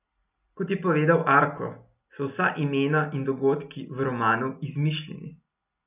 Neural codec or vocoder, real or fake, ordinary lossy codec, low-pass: none; real; none; 3.6 kHz